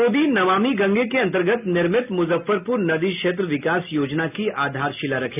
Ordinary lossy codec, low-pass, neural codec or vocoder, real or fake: none; 3.6 kHz; none; real